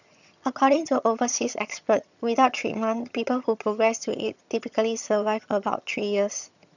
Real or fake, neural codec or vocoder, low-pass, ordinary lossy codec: fake; vocoder, 22.05 kHz, 80 mel bands, HiFi-GAN; 7.2 kHz; none